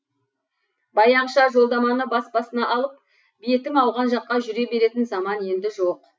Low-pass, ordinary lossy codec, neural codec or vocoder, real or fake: none; none; none; real